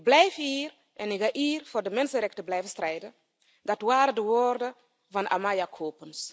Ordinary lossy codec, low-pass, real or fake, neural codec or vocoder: none; none; real; none